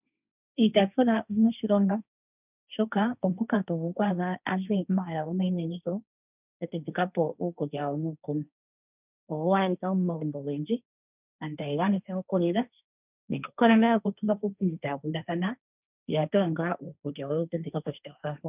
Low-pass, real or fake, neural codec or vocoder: 3.6 kHz; fake; codec, 16 kHz, 1.1 kbps, Voila-Tokenizer